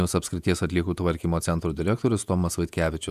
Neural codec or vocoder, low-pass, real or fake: vocoder, 48 kHz, 128 mel bands, Vocos; 14.4 kHz; fake